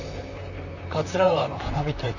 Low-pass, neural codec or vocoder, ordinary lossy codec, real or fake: 7.2 kHz; vocoder, 44.1 kHz, 128 mel bands, Pupu-Vocoder; none; fake